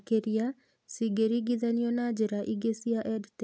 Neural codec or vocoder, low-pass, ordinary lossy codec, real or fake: none; none; none; real